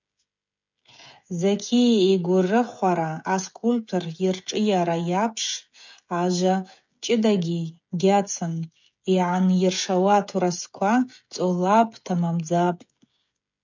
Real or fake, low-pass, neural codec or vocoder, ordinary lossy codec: fake; 7.2 kHz; codec, 16 kHz, 16 kbps, FreqCodec, smaller model; MP3, 64 kbps